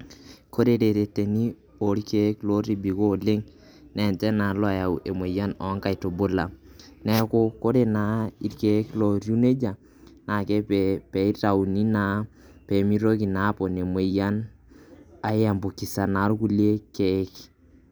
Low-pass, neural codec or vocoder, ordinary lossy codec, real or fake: none; none; none; real